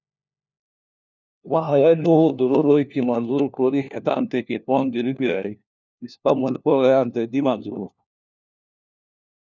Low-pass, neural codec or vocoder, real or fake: 7.2 kHz; codec, 16 kHz, 1 kbps, FunCodec, trained on LibriTTS, 50 frames a second; fake